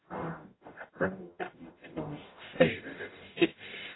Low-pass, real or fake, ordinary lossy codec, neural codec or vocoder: 7.2 kHz; fake; AAC, 16 kbps; codec, 44.1 kHz, 0.9 kbps, DAC